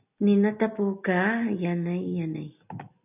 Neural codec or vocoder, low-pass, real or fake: none; 3.6 kHz; real